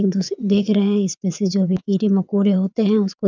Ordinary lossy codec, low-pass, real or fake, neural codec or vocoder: none; 7.2 kHz; fake; vocoder, 22.05 kHz, 80 mel bands, WaveNeXt